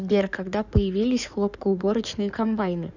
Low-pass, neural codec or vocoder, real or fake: 7.2 kHz; codec, 16 kHz in and 24 kHz out, 2.2 kbps, FireRedTTS-2 codec; fake